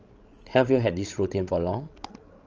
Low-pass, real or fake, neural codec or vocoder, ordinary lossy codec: 7.2 kHz; fake; codec, 16 kHz, 16 kbps, FreqCodec, larger model; Opus, 24 kbps